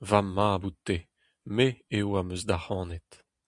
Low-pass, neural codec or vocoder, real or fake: 10.8 kHz; none; real